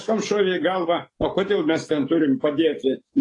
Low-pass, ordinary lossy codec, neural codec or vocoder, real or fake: 10.8 kHz; AAC, 32 kbps; codec, 44.1 kHz, 7.8 kbps, DAC; fake